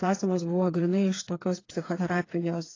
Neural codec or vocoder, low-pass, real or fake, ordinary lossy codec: codec, 16 kHz, 4 kbps, FreqCodec, smaller model; 7.2 kHz; fake; AAC, 32 kbps